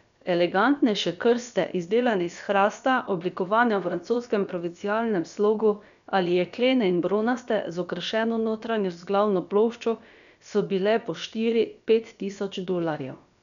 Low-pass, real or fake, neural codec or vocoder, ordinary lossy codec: 7.2 kHz; fake; codec, 16 kHz, about 1 kbps, DyCAST, with the encoder's durations; none